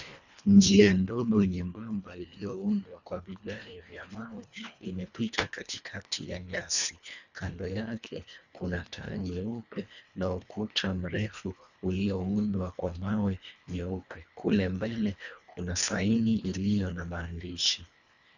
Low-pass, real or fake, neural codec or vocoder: 7.2 kHz; fake; codec, 24 kHz, 1.5 kbps, HILCodec